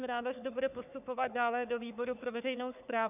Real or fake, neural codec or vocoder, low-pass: fake; codec, 44.1 kHz, 3.4 kbps, Pupu-Codec; 3.6 kHz